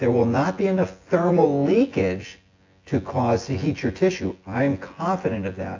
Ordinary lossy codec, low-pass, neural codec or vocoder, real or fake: AAC, 48 kbps; 7.2 kHz; vocoder, 24 kHz, 100 mel bands, Vocos; fake